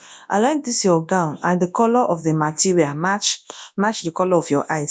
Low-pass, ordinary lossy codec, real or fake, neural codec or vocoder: 9.9 kHz; none; fake; codec, 24 kHz, 0.9 kbps, WavTokenizer, large speech release